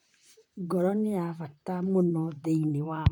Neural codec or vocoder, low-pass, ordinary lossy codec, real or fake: vocoder, 44.1 kHz, 128 mel bands, Pupu-Vocoder; 19.8 kHz; none; fake